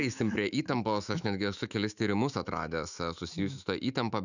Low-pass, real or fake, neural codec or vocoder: 7.2 kHz; fake; autoencoder, 48 kHz, 128 numbers a frame, DAC-VAE, trained on Japanese speech